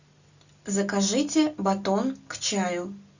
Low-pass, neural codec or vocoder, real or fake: 7.2 kHz; none; real